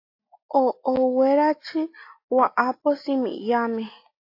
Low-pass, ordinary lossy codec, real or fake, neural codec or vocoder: 5.4 kHz; AAC, 32 kbps; real; none